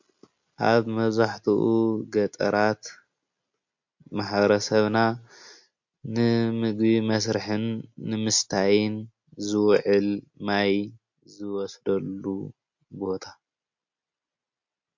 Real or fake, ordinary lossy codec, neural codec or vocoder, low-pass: real; MP3, 48 kbps; none; 7.2 kHz